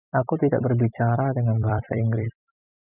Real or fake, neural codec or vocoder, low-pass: fake; vocoder, 44.1 kHz, 128 mel bands every 256 samples, BigVGAN v2; 3.6 kHz